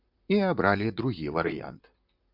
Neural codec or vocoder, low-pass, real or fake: vocoder, 44.1 kHz, 128 mel bands, Pupu-Vocoder; 5.4 kHz; fake